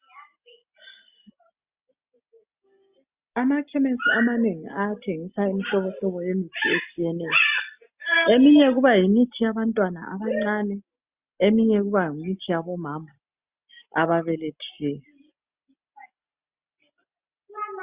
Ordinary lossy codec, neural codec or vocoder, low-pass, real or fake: Opus, 64 kbps; none; 3.6 kHz; real